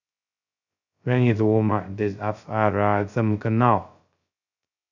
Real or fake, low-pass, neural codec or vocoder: fake; 7.2 kHz; codec, 16 kHz, 0.2 kbps, FocalCodec